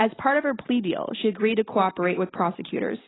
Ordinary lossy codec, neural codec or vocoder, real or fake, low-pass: AAC, 16 kbps; none; real; 7.2 kHz